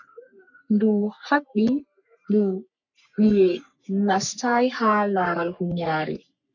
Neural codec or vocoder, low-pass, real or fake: codec, 44.1 kHz, 3.4 kbps, Pupu-Codec; 7.2 kHz; fake